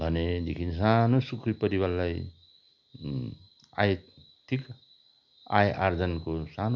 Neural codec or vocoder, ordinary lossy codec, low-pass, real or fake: none; none; 7.2 kHz; real